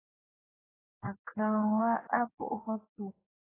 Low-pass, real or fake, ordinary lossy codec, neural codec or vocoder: 3.6 kHz; fake; AAC, 16 kbps; codec, 16 kHz in and 24 kHz out, 1.1 kbps, FireRedTTS-2 codec